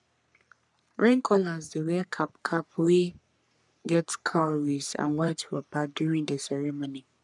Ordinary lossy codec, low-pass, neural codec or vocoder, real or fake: none; 10.8 kHz; codec, 44.1 kHz, 3.4 kbps, Pupu-Codec; fake